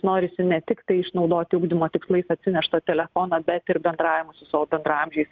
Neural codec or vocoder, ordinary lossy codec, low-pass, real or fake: none; Opus, 32 kbps; 7.2 kHz; real